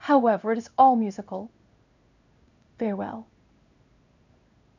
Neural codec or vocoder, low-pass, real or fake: codec, 16 kHz in and 24 kHz out, 1 kbps, XY-Tokenizer; 7.2 kHz; fake